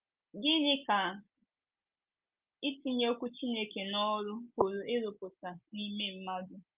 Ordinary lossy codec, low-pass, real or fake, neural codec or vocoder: Opus, 24 kbps; 3.6 kHz; real; none